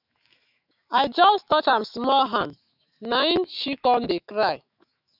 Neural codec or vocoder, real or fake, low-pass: autoencoder, 48 kHz, 128 numbers a frame, DAC-VAE, trained on Japanese speech; fake; 5.4 kHz